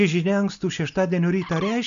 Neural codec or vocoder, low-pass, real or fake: none; 7.2 kHz; real